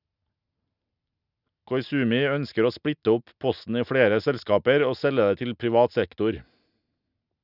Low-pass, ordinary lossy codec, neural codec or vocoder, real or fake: 5.4 kHz; none; none; real